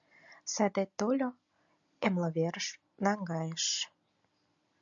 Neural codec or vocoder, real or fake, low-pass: none; real; 7.2 kHz